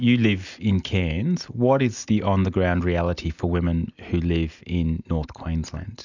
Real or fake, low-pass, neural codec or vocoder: real; 7.2 kHz; none